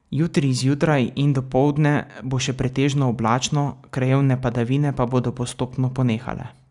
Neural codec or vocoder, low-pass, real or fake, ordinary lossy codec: none; 10.8 kHz; real; none